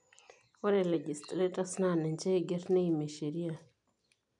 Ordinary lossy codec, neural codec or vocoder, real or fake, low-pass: none; none; real; 10.8 kHz